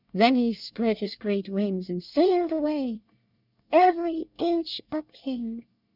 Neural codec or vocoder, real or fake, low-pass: codec, 24 kHz, 1 kbps, SNAC; fake; 5.4 kHz